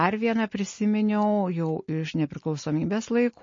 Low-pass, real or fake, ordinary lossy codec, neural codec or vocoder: 7.2 kHz; real; MP3, 32 kbps; none